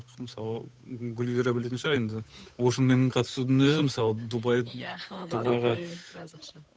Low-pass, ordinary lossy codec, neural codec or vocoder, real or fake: none; none; codec, 16 kHz, 8 kbps, FunCodec, trained on Chinese and English, 25 frames a second; fake